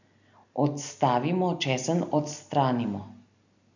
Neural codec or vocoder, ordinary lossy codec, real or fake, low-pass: none; none; real; 7.2 kHz